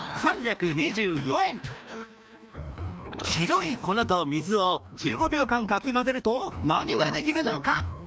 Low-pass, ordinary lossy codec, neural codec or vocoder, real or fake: none; none; codec, 16 kHz, 1 kbps, FreqCodec, larger model; fake